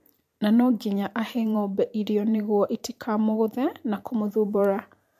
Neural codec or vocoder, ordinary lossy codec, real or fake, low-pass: vocoder, 44.1 kHz, 128 mel bands every 512 samples, BigVGAN v2; MP3, 64 kbps; fake; 14.4 kHz